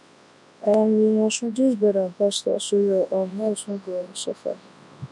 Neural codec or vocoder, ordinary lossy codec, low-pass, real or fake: codec, 24 kHz, 0.9 kbps, WavTokenizer, large speech release; none; 10.8 kHz; fake